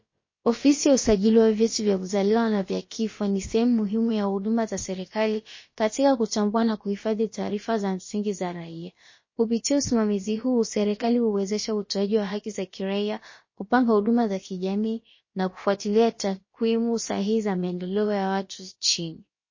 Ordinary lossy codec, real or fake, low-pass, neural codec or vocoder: MP3, 32 kbps; fake; 7.2 kHz; codec, 16 kHz, about 1 kbps, DyCAST, with the encoder's durations